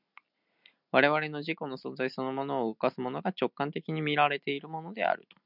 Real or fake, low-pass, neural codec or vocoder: real; 5.4 kHz; none